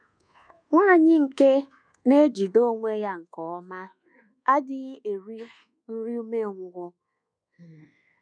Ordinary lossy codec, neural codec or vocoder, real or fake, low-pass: none; codec, 24 kHz, 1.2 kbps, DualCodec; fake; 9.9 kHz